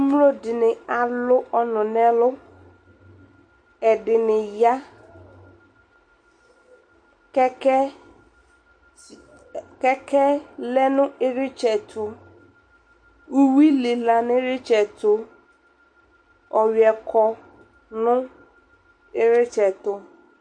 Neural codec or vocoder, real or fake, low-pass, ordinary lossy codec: none; real; 9.9 kHz; MP3, 48 kbps